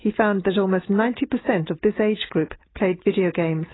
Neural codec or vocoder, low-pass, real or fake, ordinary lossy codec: none; 7.2 kHz; real; AAC, 16 kbps